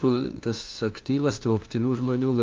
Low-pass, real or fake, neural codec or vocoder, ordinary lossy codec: 7.2 kHz; fake; codec, 16 kHz, 1 kbps, FunCodec, trained on LibriTTS, 50 frames a second; Opus, 32 kbps